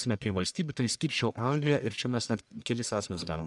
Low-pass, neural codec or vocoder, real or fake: 10.8 kHz; codec, 44.1 kHz, 1.7 kbps, Pupu-Codec; fake